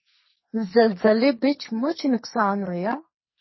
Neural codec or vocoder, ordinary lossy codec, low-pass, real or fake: codec, 44.1 kHz, 2.6 kbps, SNAC; MP3, 24 kbps; 7.2 kHz; fake